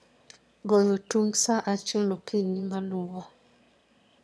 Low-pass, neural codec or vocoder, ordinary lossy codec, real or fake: none; autoencoder, 22.05 kHz, a latent of 192 numbers a frame, VITS, trained on one speaker; none; fake